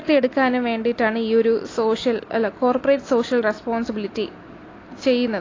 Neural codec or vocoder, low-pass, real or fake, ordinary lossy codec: none; 7.2 kHz; real; AAC, 32 kbps